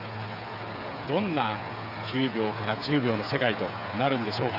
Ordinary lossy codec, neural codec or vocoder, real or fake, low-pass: none; codec, 16 kHz, 8 kbps, FreqCodec, smaller model; fake; 5.4 kHz